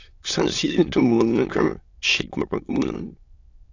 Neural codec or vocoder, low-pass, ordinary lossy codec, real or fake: autoencoder, 22.05 kHz, a latent of 192 numbers a frame, VITS, trained on many speakers; 7.2 kHz; AAC, 48 kbps; fake